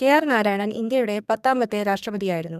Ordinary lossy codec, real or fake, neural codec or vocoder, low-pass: none; fake; codec, 32 kHz, 1.9 kbps, SNAC; 14.4 kHz